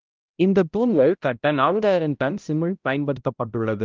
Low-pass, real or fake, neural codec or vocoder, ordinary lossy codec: 7.2 kHz; fake; codec, 16 kHz, 0.5 kbps, X-Codec, HuBERT features, trained on balanced general audio; Opus, 32 kbps